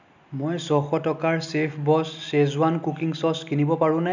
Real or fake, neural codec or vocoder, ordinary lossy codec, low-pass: real; none; none; 7.2 kHz